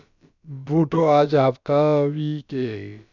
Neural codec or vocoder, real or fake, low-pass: codec, 16 kHz, about 1 kbps, DyCAST, with the encoder's durations; fake; 7.2 kHz